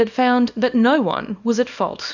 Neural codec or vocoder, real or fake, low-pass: codec, 24 kHz, 0.9 kbps, WavTokenizer, small release; fake; 7.2 kHz